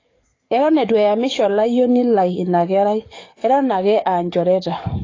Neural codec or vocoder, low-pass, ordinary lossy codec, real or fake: codec, 44.1 kHz, 7.8 kbps, DAC; 7.2 kHz; AAC, 32 kbps; fake